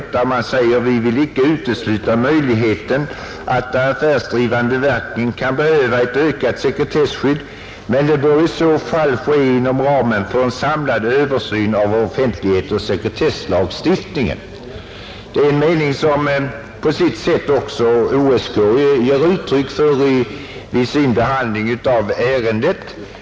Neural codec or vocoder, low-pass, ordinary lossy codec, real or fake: none; none; none; real